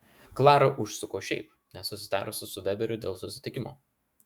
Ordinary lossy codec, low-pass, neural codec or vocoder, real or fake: Opus, 64 kbps; 19.8 kHz; autoencoder, 48 kHz, 128 numbers a frame, DAC-VAE, trained on Japanese speech; fake